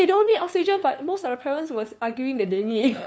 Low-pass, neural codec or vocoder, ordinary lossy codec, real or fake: none; codec, 16 kHz, 2 kbps, FunCodec, trained on LibriTTS, 25 frames a second; none; fake